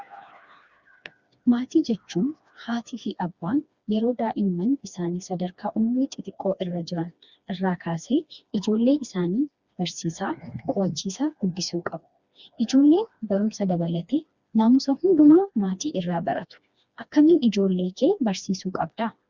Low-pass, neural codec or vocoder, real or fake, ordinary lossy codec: 7.2 kHz; codec, 16 kHz, 2 kbps, FreqCodec, smaller model; fake; Opus, 64 kbps